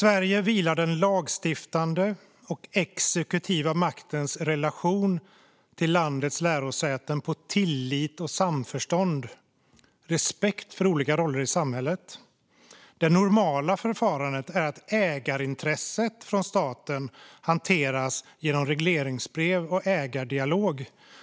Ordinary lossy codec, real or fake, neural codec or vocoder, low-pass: none; real; none; none